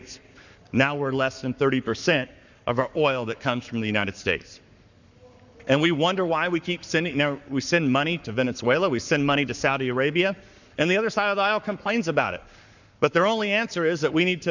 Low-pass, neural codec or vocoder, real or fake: 7.2 kHz; codec, 44.1 kHz, 7.8 kbps, Pupu-Codec; fake